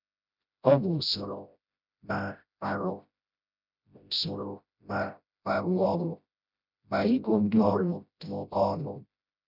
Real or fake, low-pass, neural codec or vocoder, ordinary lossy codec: fake; 5.4 kHz; codec, 16 kHz, 0.5 kbps, FreqCodec, smaller model; none